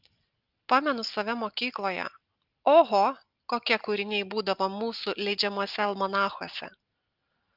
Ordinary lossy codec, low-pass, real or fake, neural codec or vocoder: Opus, 24 kbps; 5.4 kHz; real; none